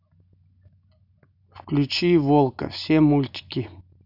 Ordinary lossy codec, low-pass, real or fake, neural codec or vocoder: none; 5.4 kHz; real; none